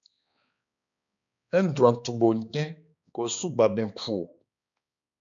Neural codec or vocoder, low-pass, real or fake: codec, 16 kHz, 2 kbps, X-Codec, HuBERT features, trained on balanced general audio; 7.2 kHz; fake